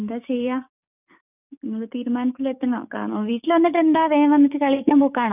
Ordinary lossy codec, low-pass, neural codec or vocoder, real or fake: none; 3.6 kHz; codec, 44.1 kHz, 7.8 kbps, DAC; fake